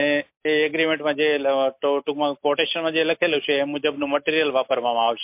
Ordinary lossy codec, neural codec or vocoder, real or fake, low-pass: MP3, 32 kbps; none; real; 3.6 kHz